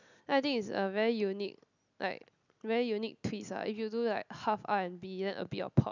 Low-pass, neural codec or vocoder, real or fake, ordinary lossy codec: 7.2 kHz; none; real; none